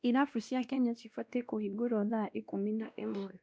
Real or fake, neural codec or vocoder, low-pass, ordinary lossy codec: fake; codec, 16 kHz, 1 kbps, X-Codec, WavLM features, trained on Multilingual LibriSpeech; none; none